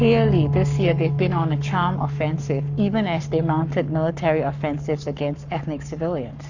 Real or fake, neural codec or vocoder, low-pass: fake; codec, 44.1 kHz, 7.8 kbps, Pupu-Codec; 7.2 kHz